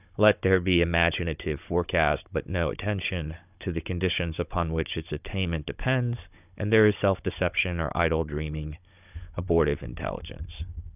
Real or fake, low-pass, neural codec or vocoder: fake; 3.6 kHz; codec, 16 kHz, 16 kbps, FunCodec, trained on Chinese and English, 50 frames a second